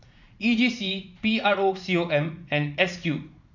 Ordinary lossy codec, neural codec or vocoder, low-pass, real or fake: none; vocoder, 44.1 kHz, 80 mel bands, Vocos; 7.2 kHz; fake